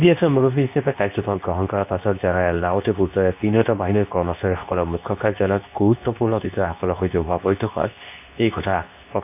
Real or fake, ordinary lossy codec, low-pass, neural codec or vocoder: fake; none; 3.6 kHz; codec, 24 kHz, 0.9 kbps, WavTokenizer, medium speech release version 2